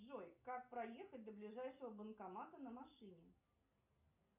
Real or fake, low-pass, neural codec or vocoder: real; 3.6 kHz; none